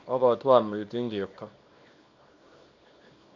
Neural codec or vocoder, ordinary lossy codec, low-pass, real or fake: codec, 24 kHz, 0.9 kbps, WavTokenizer, small release; MP3, 48 kbps; 7.2 kHz; fake